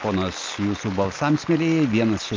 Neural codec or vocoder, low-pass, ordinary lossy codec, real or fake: none; 7.2 kHz; Opus, 24 kbps; real